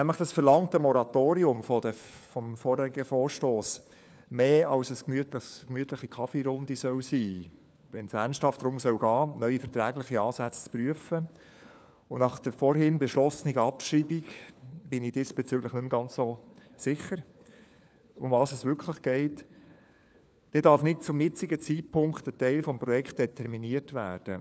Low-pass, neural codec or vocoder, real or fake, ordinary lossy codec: none; codec, 16 kHz, 4 kbps, FunCodec, trained on LibriTTS, 50 frames a second; fake; none